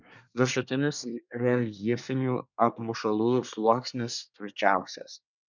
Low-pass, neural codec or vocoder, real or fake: 7.2 kHz; codec, 24 kHz, 1 kbps, SNAC; fake